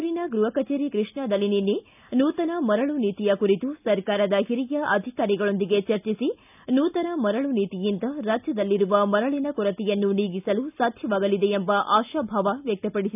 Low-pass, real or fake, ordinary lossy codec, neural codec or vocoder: 3.6 kHz; real; none; none